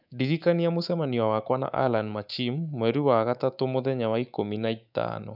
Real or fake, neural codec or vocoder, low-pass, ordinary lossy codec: fake; codec, 24 kHz, 3.1 kbps, DualCodec; 5.4 kHz; none